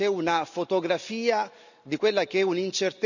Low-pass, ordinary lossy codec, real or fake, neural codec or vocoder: 7.2 kHz; none; real; none